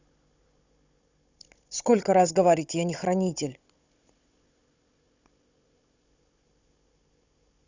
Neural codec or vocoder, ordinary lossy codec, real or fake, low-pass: codec, 16 kHz, 16 kbps, FunCodec, trained on Chinese and English, 50 frames a second; Opus, 64 kbps; fake; 7.2 kHz